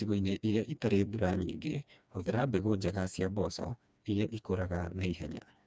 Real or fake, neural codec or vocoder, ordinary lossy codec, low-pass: fake; codec, 16 kHz, 2 kbps, FreqCodec, smaller model; none; none